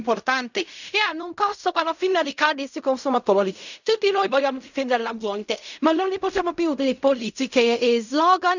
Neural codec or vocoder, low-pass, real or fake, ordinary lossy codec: codec, 16 kHz in and 24 kHz out, 0.4 kbps, LongCat-Audio-Codec, fine tuned four codebook decoder; 7.2 kHz; fake; none